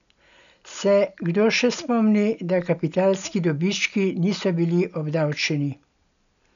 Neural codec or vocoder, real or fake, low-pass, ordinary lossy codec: none; real; 7.2 kHz; none